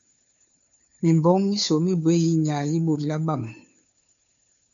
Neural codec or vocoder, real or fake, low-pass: codec, 16 kHz, 2 kbps, FunCodec, trained on Chinese and English, 25 frames a second; fake; 7.2 kHz